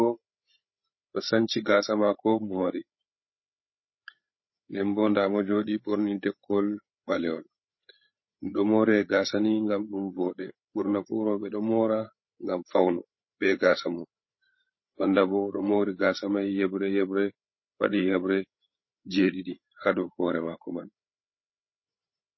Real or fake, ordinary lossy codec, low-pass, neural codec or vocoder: fake; MP3, 24 kbps; 7.2 kHz; codec, 16 kHz, 8 kbps, FreqCodec, larger model